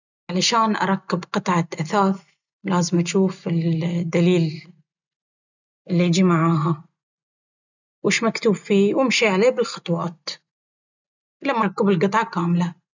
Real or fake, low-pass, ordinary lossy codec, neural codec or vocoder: real; 7.2 kHz; none; none